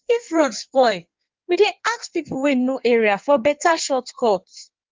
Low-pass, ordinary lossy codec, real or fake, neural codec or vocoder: 7.2 kHz; Opus, 32 kbps; fake; codec, 16 kHz in and 24 kHz out, 1.1 kbps, FireRedTTS-2 codec